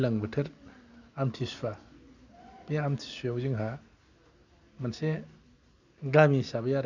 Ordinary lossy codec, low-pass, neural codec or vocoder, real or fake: none; 7.2 kHz; none; real